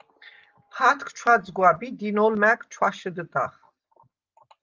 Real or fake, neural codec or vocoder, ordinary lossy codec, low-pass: real; none; Opus, 32 kbps; 7.2 kHz